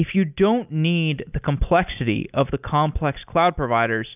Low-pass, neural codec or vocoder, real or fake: 3.6 kHz; none; real